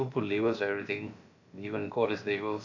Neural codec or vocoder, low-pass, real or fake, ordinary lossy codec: codec, 16 kHz, about 1 kbps, DyCAST, with the encoder's durations; 7.2 kHz; fake; none